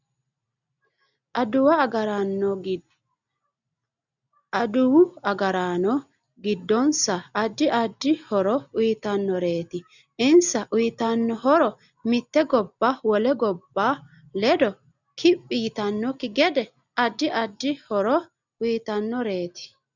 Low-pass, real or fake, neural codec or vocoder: 7.2 kHz; real; none